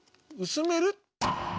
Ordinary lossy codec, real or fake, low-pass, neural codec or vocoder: none; real; none; none